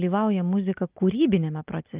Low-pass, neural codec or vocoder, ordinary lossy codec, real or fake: 3.6 kHz; none; Opus, 32 kbps; real